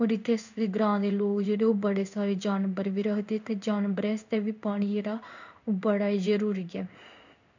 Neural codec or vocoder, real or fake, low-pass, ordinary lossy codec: codec, 16 kHz in and 24 kHz out, 1 kbps, XY-Tokenizer; fake; 7.2 kHz; none